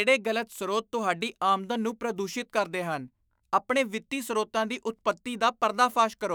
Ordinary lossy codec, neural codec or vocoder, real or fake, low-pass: none; autoencoder, 48 kHz, 128 numbers a frame, DAC-VAE, trained on Japanese speech; fake; none